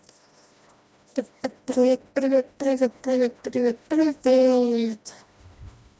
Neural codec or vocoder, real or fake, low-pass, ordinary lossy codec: codec, 16 kHz, 1 kbps, FreqCodec, smaller model; fake; none; none